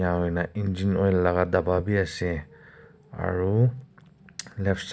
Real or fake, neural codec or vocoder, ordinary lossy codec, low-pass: real; none; none; none